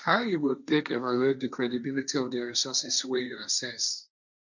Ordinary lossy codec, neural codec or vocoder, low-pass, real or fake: none; codec, 16 kHz, 1.1 kbps, Voila-Tokenizer; 7.2 kHz; fake